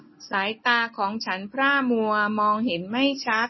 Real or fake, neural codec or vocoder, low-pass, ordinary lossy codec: real; none; 7.2 kHz; MP3, 24 kbps